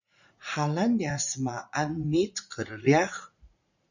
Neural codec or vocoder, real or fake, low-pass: vocoder, 44.1 kHz, 80 mel bands, Vocos; fake; 7.2 kHz